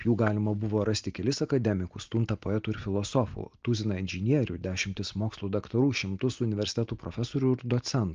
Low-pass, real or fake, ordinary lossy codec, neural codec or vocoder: 7.2 kHz; real; Opus, 24 kbps; none